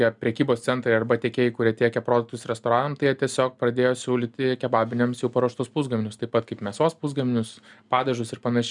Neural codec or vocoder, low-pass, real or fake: none; 10.8 kHz; real